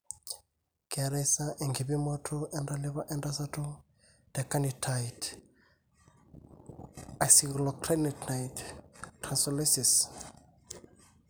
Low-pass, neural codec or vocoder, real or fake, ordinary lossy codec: none; none; real; none